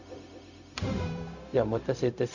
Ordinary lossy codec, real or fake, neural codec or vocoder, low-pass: none; fake; codec, 16 kHz, 0.4 kbps, LongCat-Audio-Codec; 7.2 kHz